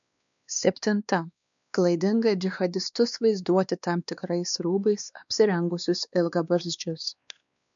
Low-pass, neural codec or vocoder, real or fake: 7.2 kHz; codec, 16 kHz, 2 kbps, X-Codec, WavLM features, trained on Multilingual LibriSpeech; fake